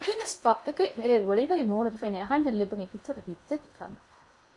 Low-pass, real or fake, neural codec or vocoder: 10.8 kHz; fake; codec, 16 kHz in and 24 kHz out, 0.8 kbps, FocalCodec, streaming, 65536 codes